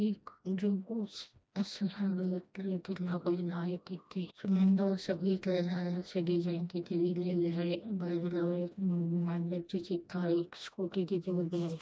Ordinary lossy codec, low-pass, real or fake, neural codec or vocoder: none; none; fake; codec, 16 kHz, 1 kbps, FreqCodec, smaller model